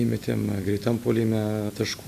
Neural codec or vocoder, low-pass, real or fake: none; 14.4 kHz; real